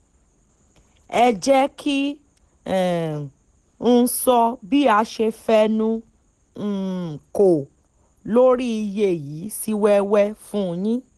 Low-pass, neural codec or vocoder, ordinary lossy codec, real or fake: 10.8 kHz; none; Opus, 16 kbps; real